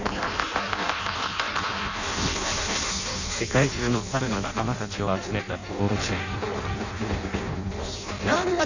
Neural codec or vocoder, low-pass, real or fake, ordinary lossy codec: codec, 16 kHz in and 24 kHz out, 0.6 kbps, FireRedTTS-2 codec; 7.2 kHz; fake; none